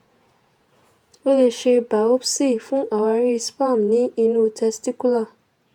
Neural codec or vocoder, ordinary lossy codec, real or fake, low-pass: vocoder, 48 kHz, 128 mel bands, Vocos; none; fake; 19.8 kHz